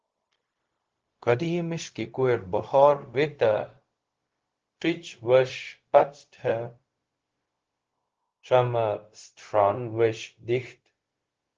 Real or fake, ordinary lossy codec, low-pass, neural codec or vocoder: fake; Opus, 16 kbps; 7.2 kHz; codec, 16 kHz, 0.4 kbps, LongCat-Audio-Codec